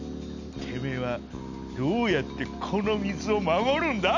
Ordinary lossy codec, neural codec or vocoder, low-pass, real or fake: none; none; 7.2 kHz; real